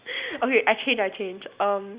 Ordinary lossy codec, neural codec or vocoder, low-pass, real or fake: Opus, 64 kbps; none; 3.6 kHz; real